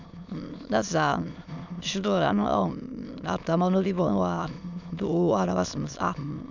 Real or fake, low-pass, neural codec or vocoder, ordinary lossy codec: fake; 7.2 kHz; autoencoder, 22.05 kHz, a latent of 192 numbers a frame, VITS, trained on many speakers; none